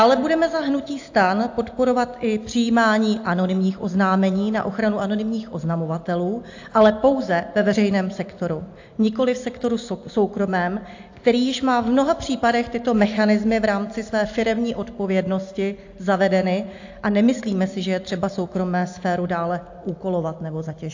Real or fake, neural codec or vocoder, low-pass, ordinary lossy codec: real; none; 7.2 kHz; AAC, 48 kbps